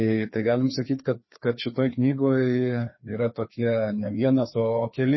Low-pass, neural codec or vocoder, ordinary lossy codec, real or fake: 7.2 kHz; codec, 16 kHz, 2 kbps, FreqCodec, larger model; MP3, 24 kbps; fake